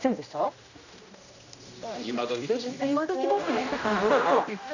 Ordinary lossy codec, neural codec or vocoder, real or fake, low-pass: none; codec, 16 kHz, 1 kbps, X-Codec, HuBERT features, trained on general audio; fake; 7.2 kHz